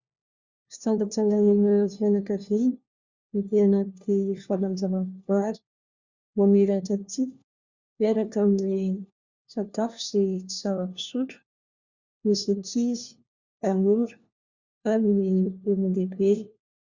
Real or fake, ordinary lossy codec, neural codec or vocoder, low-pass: fake; Opus, 64 kbps; codec, 16 kHz, 1 kbps, FunCodec, trained on LibriTTS, 50 frames a second; 7.2 kHz